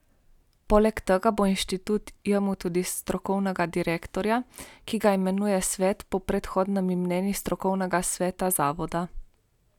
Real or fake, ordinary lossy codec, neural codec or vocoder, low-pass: real; none; none; 19.8 kHz